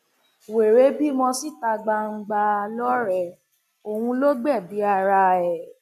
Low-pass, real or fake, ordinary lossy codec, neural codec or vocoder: 14.4 kHz; real; none; none